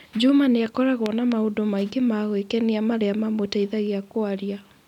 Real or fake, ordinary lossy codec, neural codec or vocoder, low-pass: real; none; none; 19.8 kHz